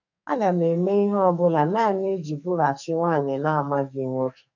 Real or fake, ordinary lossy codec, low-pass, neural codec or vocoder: fake; none; 7.2 kHz; codec, 44.1 kHz, 2.6 kbps, SNAC